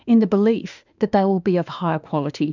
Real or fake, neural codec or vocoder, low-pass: fake; autoencoder, 48 kHz, 32 numbers a frame, DAC-VAE, trained on Japanese speech; 7.2 kHz